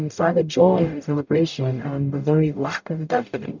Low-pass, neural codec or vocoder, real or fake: 7.2 kHz; codec, 44.1 kHz, 0.9 kbps, DAC; fake